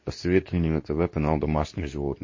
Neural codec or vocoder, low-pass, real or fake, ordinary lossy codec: codec, 24 kHz, 0.9 kbps, WavTokenizer, medium speech release version 2; 7.2 kHz; fake; MP3, 32 kbps